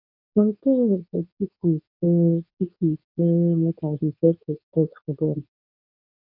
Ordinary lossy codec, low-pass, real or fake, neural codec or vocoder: none; 5.4 kHz; fake; codec, 24 kHz, 0.9 kbps, WavTokenizer, medium speech release version 2